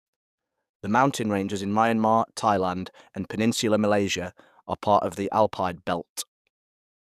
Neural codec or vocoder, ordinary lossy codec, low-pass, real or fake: codec, 44.1 kHz, 7.8 kbps, DAC; none; 14.4 kHz; fake